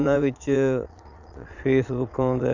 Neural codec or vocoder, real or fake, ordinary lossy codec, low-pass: vocoder, 22.05 kHz, 80 mel bands, Vocos; fake; none; 7.2 kHz